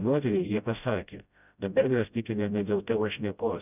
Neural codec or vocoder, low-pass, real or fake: codec, 16 kHz, 0.5 kbps, FreqCodec, smaller model; 3.6 kHz; fake